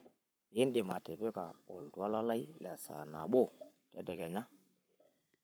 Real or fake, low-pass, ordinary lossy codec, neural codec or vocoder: fake; none; none; codec, 44.1 kHz, 7.8 kbps, Pupu-Codec